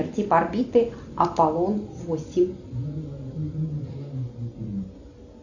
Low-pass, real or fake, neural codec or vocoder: 7.2 kHz; real; none